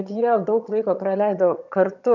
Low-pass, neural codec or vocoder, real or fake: 7.2 kHz; vocoder, 22.05 kHz, 80 mel bands, HiFi-GAN; fake